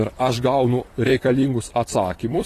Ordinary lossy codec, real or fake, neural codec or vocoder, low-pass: AAC, 48 kbps; fake; vocoder, 44.1 kHz, 128 mel bands, Pupu-Vocoder; 14.4 kHz